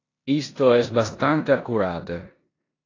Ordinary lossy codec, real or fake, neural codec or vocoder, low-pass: AAC, 32 kbps; fake; codec, 16 kHz in and 24 kHz out, 0.9 kbps, LongCat-Audio-Codec, four codebook decoder; 7.2 kHz